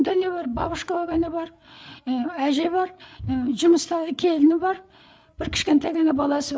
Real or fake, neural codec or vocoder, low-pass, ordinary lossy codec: fake; codec, 16 kHz, 8 kbps, FreqCodec, larger model; none; none